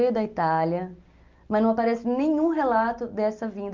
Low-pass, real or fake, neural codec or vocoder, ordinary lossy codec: 7.2 kHz; real; none; Opus, 24 kbps